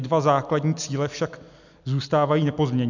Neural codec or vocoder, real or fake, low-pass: none; real; 7.2 kHz